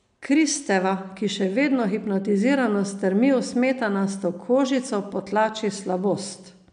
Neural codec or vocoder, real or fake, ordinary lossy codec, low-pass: none; real; none; 9.9 kHz